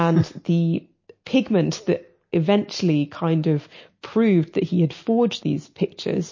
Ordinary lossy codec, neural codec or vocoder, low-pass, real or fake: MP3, 32 kbps; none; 7.2 kHz; real